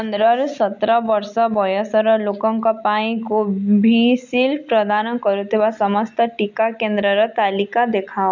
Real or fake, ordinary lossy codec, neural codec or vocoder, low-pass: real; none; none; 7.2 kHz